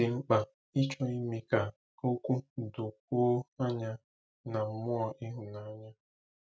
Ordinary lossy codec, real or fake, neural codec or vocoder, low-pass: none; real; none; none